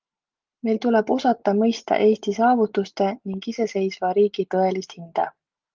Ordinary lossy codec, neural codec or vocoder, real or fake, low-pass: Opus, 32 kbps; none; real; 7.2 kHz